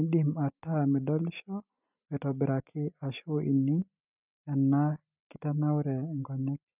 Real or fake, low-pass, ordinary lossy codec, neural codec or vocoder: real; 3.6 kHz; none; none